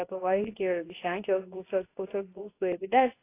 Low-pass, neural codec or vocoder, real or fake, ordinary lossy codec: 3.6 kHz; codec, 24 kHz, 0.9 kbps, WavTokenizer, medium speech release version 2; fake; AAC, 24 kbps